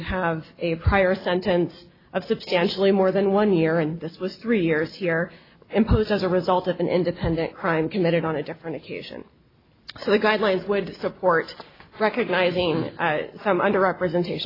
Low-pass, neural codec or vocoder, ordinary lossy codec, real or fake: 5.4 kHz; none; AAC, 24 kbps; real